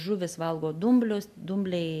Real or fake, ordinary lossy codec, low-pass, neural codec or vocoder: real; AAC, 96 kbps; 14.4 kHz; none